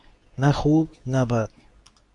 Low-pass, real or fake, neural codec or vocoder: 10.8 kHz; fake; codec, 24 kHz, 0.9 kbps, WavTokenizer, medium speech release version 2